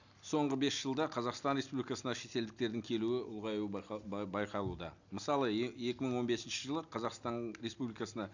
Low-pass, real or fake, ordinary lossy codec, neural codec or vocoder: 7.2 kHz; real; none; none